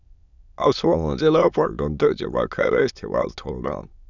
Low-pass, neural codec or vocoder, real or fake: 7.2 kHz; autoencoder, 22.05 kHz, a latent of 192 numbers a frame, VITS, trained on many speakers; fake